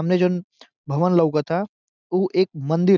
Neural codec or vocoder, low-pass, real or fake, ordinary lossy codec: none; 7.2 kHz; real; none